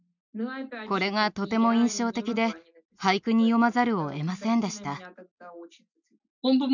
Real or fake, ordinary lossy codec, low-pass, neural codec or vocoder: real; none; 7.2 kHz; none